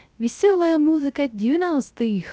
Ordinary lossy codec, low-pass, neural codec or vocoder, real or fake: none; none; codec, 16 kHz, 0.3 kbps, FocalCodec; fake